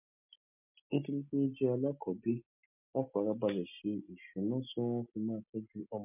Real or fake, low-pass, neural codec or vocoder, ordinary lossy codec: real; 3.6 kHz; none; MP3, 32 kbps